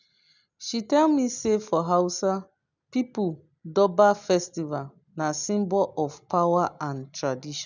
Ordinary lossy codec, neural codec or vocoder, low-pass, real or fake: none; none; 7.2 kHz; real